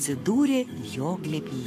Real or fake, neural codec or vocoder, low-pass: fake; codec, 44.1 kHz, 7.8 kbps, Pupu-Codec; 14.4 kHz